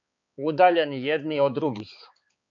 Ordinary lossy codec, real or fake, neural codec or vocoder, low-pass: AAC, 64 kbps; fake; codec, 16 kHz, 4 kbps, X-Codec, HuBERT features, trained on balanced general audio; 7.2 kHz